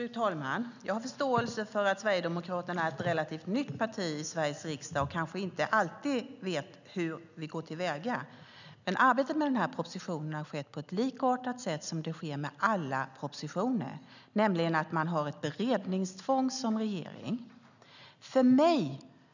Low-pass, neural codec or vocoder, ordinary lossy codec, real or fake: 7.2 kHz; none; none; real